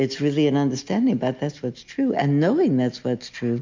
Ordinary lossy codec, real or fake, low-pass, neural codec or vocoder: MP3, 64 kbps; real; 7.2 kHz; none